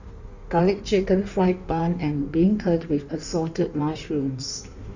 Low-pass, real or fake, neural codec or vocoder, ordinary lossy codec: 7.2 kHz; fake; codec, 16 kHz in and 24 kHz out, 1.1 kbps, FireRedTTS-2 codec; none